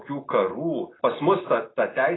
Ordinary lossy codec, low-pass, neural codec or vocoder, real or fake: AAC, 16 kbps; 7.2 kHz; none; real